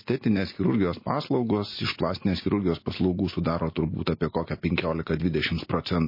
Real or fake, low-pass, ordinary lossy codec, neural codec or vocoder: real; 5.4 kHz; MP3, 24 kbps; none